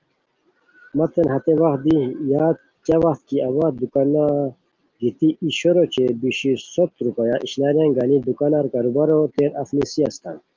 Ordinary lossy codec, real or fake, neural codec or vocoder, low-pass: Opus, 32 kbps; real; none; 7.2 kHz